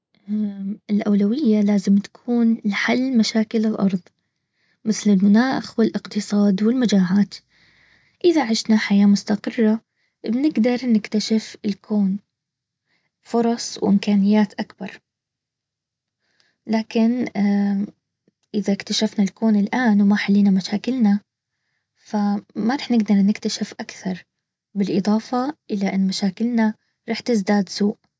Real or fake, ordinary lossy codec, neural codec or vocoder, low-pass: real; none; none; none